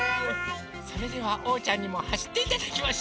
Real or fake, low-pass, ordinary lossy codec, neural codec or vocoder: real; none; none; none